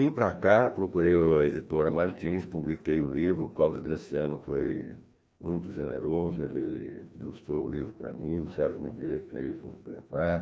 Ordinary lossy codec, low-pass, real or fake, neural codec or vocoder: none; none; fake; codec, 16 kHz, 1 kbps, FreqCodec, larger model